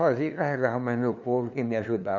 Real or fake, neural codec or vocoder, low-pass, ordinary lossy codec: fake; codec, 16 kHz, 2 kbps, FunCodec, trained on LibriTTS, 25 frames a second; 7.2 kHz; none